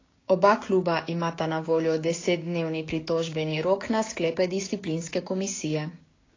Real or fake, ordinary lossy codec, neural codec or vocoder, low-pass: fake; AAC, 32 kbps; codec, 16 kHz, 6 kbps, DAC; 7.2 kHz